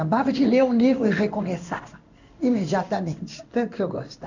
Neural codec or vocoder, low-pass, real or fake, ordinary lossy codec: codec, 16 kHz in and 24 kHz out, 1 kbps, XY-Tokenizer; 7.2 kHz; fake; none